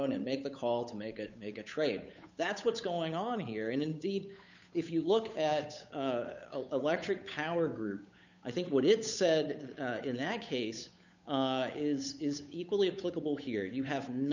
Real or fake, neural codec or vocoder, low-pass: fake; codec, 16 kHz, 8 kbps, FunCodec, trained on Chinese and English, 25 frames a second; 7.2 kHz